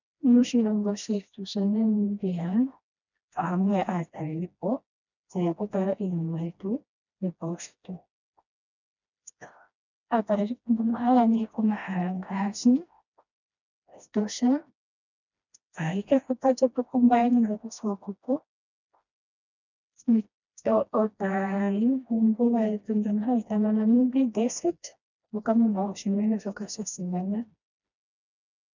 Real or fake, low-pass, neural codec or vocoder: fake; 7.2 kHz; codec, 16 kHz, 1 kbps, FreqCodec, smaller model